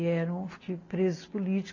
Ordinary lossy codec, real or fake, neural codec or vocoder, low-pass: AAC, 32 kbps; real; none; 7.2 kHz